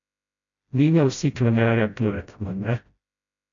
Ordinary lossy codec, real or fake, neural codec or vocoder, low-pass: none; fake; codec, 16 kHz, 0.5 kbps, FreqCodec, smaller model; 7.2 kHz